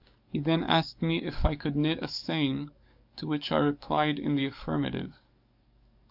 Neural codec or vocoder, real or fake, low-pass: codec, 44.1 kHz, 7.8 kbps, DAC; fake; 5.4 kHz